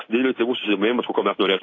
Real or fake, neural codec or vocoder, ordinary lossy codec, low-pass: real; none; AAC, 32 kbps; 7.2 kHz